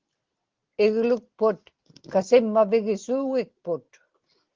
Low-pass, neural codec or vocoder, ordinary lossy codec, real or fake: 7.2 kHz; none; Opus, 16 kbps; real